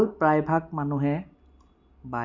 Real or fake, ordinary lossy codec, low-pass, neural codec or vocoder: real; none; 7.2 kHz; none